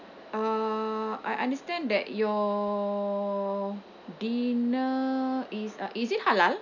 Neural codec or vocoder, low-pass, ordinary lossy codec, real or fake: none; 7.2 kHz; none; real